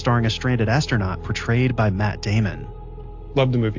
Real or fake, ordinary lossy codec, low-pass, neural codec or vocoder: real; AAC, 48 kbps; 7.2 kHz; none